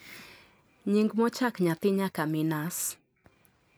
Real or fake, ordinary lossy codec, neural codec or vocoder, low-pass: real; none; none; none